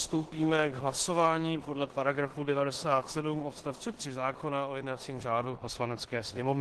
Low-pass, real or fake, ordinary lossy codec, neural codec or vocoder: 10.8 kHz; fake; Opus, 16 kbps; codec, 16 kHz in and 24 kHz out, 0.9 kbps, LongCat-Audio-Codec, four codebook decoder